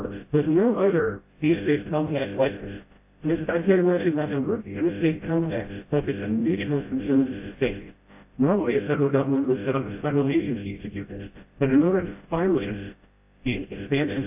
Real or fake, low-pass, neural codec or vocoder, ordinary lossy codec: fake; 3.6 kHz; codec, 16 kHz, 0.5 kbps, FreqCodec, smaller model; AAC, 32 kbps